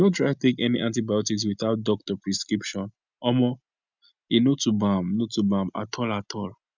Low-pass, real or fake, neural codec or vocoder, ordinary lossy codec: 7.2 kHz; real; none; none